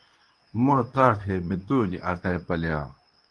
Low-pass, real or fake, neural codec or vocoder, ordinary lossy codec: 9.9 kHz; fake; codec, 24 kHz, 0.9 kbps, WavTokenizer, medium speech release version 2; Opus, 32 kbps